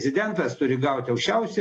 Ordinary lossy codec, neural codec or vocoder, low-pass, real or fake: AAC, 32 kbps; none; 10.8 kHz; real